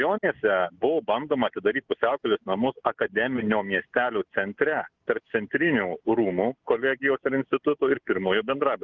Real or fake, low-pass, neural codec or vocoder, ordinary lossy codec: real; 7.2 kHz; none; Opus, 32 kbps